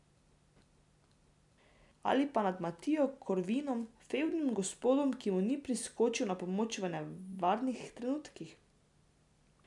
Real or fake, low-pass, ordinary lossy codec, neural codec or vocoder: real; 10.8 kHz; none; none